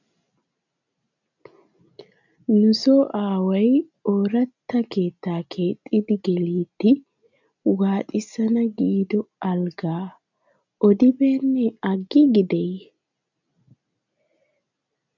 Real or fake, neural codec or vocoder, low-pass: real; none; 7.2 kHz